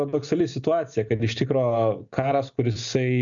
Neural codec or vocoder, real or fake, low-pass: none; real; 7.2 kHz